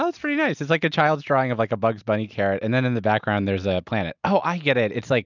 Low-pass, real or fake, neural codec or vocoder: 7.2 kHz; real; none